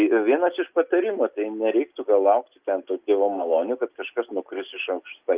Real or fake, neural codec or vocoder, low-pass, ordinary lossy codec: real; none; 3.6 kHz; Opus, 32 kbps